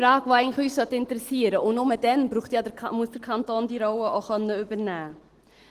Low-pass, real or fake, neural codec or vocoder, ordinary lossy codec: 14.4 kHz; real; none; Opus, 16 kbps